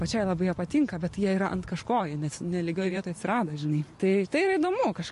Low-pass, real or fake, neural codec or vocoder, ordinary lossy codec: 14.4 kHz; fake; vocoder, 44.1 kHz, 128 mel bands every 512 samples, BigVGAN v2; MP3, 48 kbps